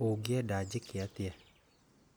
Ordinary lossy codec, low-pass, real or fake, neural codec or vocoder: none; none; real; none